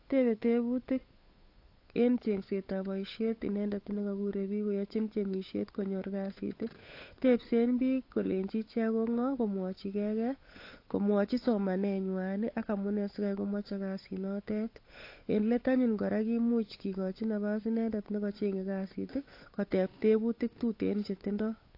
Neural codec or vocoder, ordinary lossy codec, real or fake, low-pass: codec, 16 kHz, 8 kbps, FunCodec, trained on Chinese and English, 25 frames a second; AAC, 32 kbps; fake; 5.4 kHz